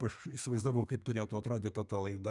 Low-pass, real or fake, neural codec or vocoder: 10.8 kHz; fake; codec, 32 kHz, 1.9 kbps, SNAC